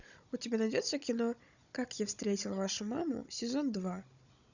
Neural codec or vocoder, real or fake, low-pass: codec, 16 kHz, 16 kbps, FunCodec, trained on Chinese and English, 50 frames a second; fake; 7.2 kHz